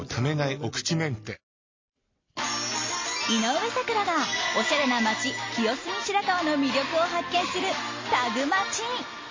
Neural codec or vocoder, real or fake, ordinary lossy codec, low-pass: none; real; MP3, 32 kbps; 7.2 kHz